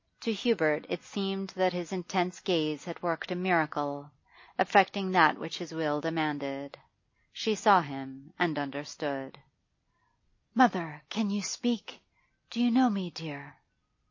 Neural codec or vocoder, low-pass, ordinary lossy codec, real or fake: none; 7.2 kHz; MP3, 32 kbps; real